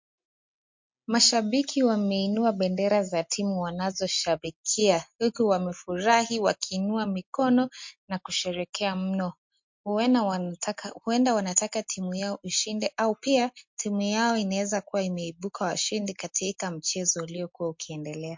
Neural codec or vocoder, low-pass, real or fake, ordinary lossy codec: none; 7.2 kHz; real; MP3, 48 kbps